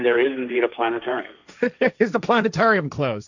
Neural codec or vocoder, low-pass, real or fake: codec, 16 kHz, 1.1 kbps, Voila-Tokenizer; 7.2 kHz; fake